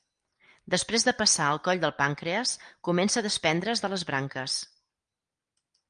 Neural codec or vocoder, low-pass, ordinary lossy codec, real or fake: none; 9.9 kHz; Opus, 32 kbps; real